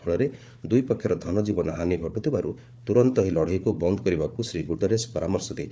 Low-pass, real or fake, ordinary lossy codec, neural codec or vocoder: none; fake; none; codec, 16 kHz, 4 kbps, FunCodec, trained on Chinese and English, 50 frames a second